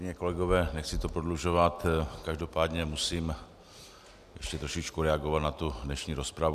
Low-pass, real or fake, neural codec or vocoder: 14.4 kHz; real; none